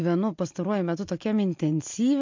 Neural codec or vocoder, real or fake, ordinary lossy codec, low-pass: none; real; MP3, 48 kbps; 7.2 kHz